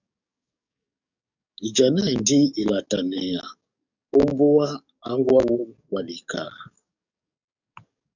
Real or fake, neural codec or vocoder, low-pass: fake; codec, 44.1 kHz, 7.8 kbps, DAC; 7.2 kHz